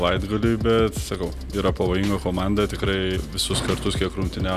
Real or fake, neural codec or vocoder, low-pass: real; none; 14.4 kHz